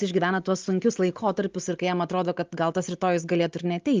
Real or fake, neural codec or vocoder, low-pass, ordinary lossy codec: real; none; 7.2 kHz; Opus, 32 kbps